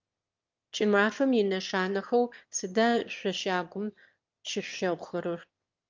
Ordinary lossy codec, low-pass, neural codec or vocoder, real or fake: Opus, 24 kbps; 7.2 kHz; autoencoder, 22.05 kHz, a latent of 192 numbers a frame, VITS, trained on one speaker; fake